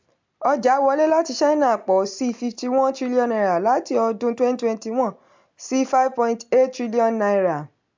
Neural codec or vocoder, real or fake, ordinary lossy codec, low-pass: none; real; MP3, 64 kbps; 7.2 kHz